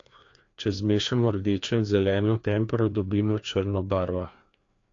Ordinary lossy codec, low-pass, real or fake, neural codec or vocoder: AAC, 48 kbps; 7.2 kHz; fake; codec, 16 kHz, 2 kbps, FreqCodec, larger model